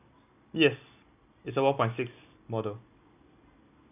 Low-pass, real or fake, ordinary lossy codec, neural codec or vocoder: 3.6 kHz; real; none; none